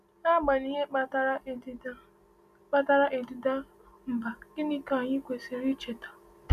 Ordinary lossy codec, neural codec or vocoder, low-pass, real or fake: none; none; 14.4 kHz; real